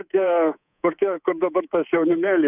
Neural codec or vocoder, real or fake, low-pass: codec, 24 kHz, 3.1 kbps, DualCodec; fake; 3.6 kHz